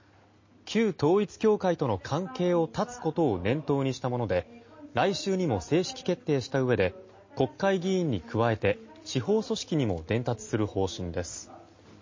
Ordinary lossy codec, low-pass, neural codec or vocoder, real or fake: MP3, 32 kbps; 7.2 kHz; none; real